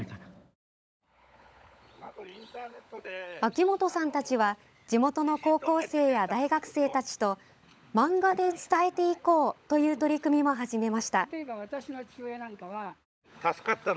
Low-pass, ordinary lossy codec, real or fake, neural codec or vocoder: none; none; fake; codec, 16 kHz, 16 kbps, FunCodec, trained on LibriTTS, 50 frames a second